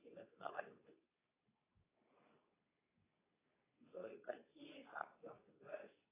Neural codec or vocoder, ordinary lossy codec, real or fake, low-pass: codec, 24 kHz, 0.9 kbps, WavTokenizer, medium speech release version 2; AAC, 16 kbps; fake; 3.6 kHz